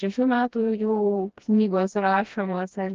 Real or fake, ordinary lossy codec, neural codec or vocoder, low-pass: fake; Opus, 32 kbps; codec, 16 kHz, 1 kbps, FreqCodec, smaller model; 7.2 kHz